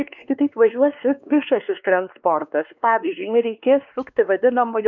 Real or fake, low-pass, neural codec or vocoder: fake; 7.2 kHz; codec, 16 kHz, 2 kbps, X-Codec, WavLM features, trained on Multilingual LibriSpeech